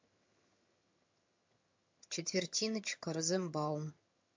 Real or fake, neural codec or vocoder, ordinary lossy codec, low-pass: fake; vocoder, 22.05 kHz, 80 mel bands, HiFi-GAN; MP3, 48 kbps; 7.2 kHz